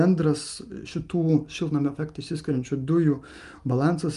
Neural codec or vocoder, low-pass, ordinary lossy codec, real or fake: none; 10.8 kHz; Opus, 32 kbps; real